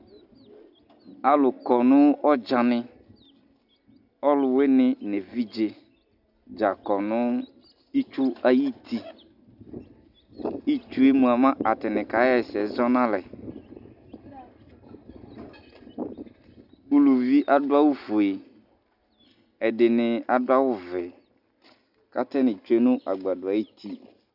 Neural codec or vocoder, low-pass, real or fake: none; 5.4 kHz; real